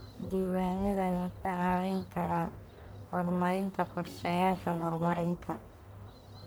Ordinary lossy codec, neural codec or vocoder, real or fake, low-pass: none; codec, 44.1 kHz, 1.7 kbps, Pupu-Codec; fake; none